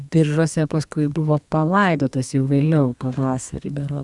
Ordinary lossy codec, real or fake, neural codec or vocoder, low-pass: Opus, 64 kbps; fake; codec, 32 kHz, 1.9 kbps, SNAC; 10.8 kHz